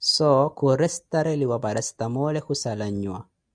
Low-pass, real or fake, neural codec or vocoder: 9.9 kHz; real; none